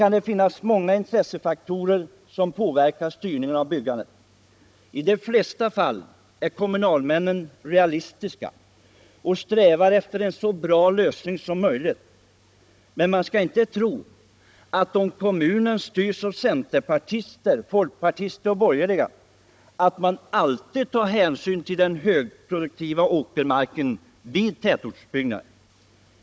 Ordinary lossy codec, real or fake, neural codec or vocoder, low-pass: none; fake; codec, 16 kHz, 16 kbps, FunCodec, trained on Chinese and English, 50 frames a second; none